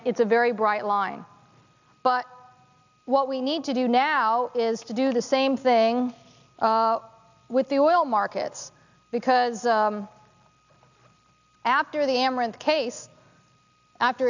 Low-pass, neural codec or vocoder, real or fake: 7.2 kHz; none; real